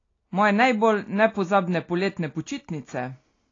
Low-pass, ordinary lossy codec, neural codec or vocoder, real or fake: 7.2 kHz; AAC, 32 kbps; none; real